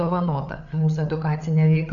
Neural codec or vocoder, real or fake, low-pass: codec, 16 kHz, 8 kbps, FreqCodec, larger model; fake; 7.2 kHz